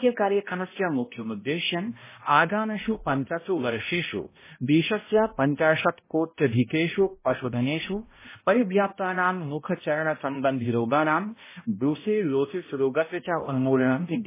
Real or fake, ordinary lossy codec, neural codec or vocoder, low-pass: fake; MP3, 16 kbps; codec, 16 kHz, 0.5 kbps, X-Codec, HuBERT features, trained on balanced general audio; 3.6 kHz